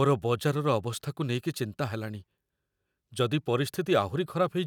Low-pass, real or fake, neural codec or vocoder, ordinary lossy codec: 19.8 kHz; real; none; none